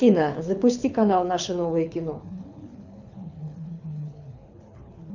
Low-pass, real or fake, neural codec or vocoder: 7.2 kHz; fake; codec, 24 kHz, 6 kbps, HILCodec